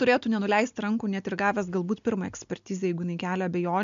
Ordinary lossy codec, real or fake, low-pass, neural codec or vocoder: MP3, 64 kbps; real; 7.2 kHz; none